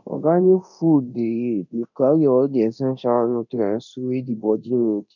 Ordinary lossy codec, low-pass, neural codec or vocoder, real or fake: none; 7.2 kHz; codec, 24 kHz, 0.9 kbps, DualCodec; fake